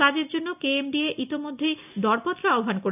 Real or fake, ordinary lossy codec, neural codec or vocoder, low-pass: real; none; none; 3.6 kHz